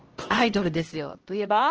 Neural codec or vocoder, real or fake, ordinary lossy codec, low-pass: codec, 16 kHz, 1 kbps, X-Codec, HuBERT features, trained on LibriSpeech; fake; Opus, 16 kbps; 7.2 kHz